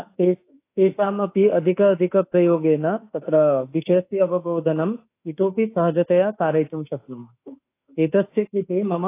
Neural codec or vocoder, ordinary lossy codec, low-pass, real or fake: autoencoder, 48 kHz, 32 numbers a frame, DAC-VAE, trained on Japanese speech; AAC, 24 kbps; 3.6 kHz; fake